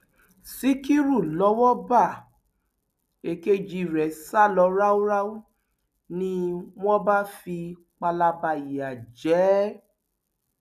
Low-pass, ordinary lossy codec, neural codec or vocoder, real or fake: 14.4 kHz; none; none; real